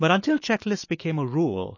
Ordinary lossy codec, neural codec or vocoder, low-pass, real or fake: MP3, 32 kbps; autoencoder, 48 kHz, 128 numbers a frame, DAC-VAE, trained on Japanese speech; 7.2 kHz; fake